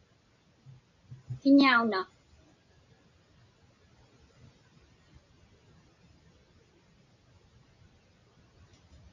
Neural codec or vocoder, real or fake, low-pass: none; real; 7.2 kHz